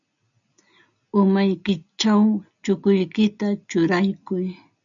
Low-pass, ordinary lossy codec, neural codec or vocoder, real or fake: 7.2 kHz; MP3, 96 kbps; none; real